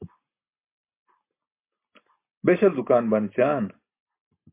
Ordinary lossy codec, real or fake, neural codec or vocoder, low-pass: MP3, 24 kbps; real; none; 3.6 kHz